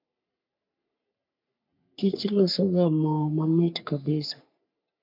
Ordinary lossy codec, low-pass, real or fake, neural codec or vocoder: MP3, 48 kbps; 5.4 kHz; fake; codec, 44.1 kHz, 3.4 kbps, Pupu-Codec